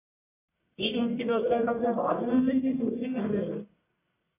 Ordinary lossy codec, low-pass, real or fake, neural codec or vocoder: AAC, 24 kbps; 3.6 kHz; fake; codec, 44.1 kHz, 1.7 kbps, Pupu-Codec